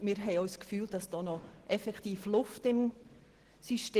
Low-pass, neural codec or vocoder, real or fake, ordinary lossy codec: 14.4 kHz; none; real; Opus, 16 kbps